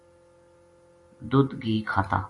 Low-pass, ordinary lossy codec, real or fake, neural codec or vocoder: 10.8 kHz; AAC, 48 kbps; real; none